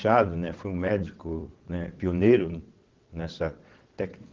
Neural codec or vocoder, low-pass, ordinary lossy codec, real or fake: vocoder, 22.05 kHz, 80 mel bands, WaveNeXt; 7.2 kHz; Opus, 16 kbps; fake